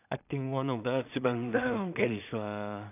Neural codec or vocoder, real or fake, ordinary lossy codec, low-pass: codec, 16 kHz in and 24 kHz out, 0.4 kbps, LongCat-Audio-Codec, two codebook decoder; fake; none; 3.6 kHz